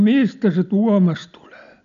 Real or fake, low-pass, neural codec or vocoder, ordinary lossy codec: real; 7.2 kHz; none; none